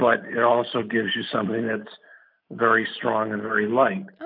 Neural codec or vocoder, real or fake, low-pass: none; real; 5.4 kHz